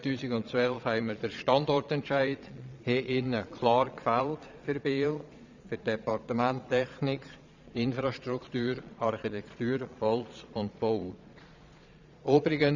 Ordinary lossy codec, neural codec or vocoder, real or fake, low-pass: none; vocoder, 22.05 kHz, 80 mel bands, Vocos; fake; 7.2 kHz